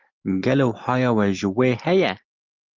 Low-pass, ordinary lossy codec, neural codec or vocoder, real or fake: 7.2 kHz; Opus, 24 kbps; none; real